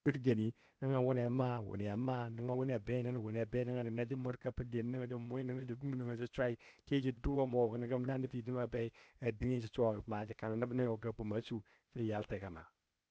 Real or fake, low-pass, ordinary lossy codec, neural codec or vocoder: fake; none; none; codec, 16 kHz, 0.8 kbps, ZipCodec